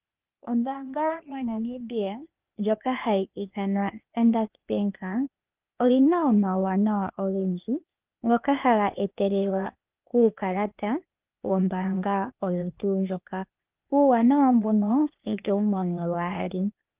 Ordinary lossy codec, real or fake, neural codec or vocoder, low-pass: Opus, 32 kbps; fake; codec, 16 kHz, 0.8 kbps, ZipCodec; 3.6 kHz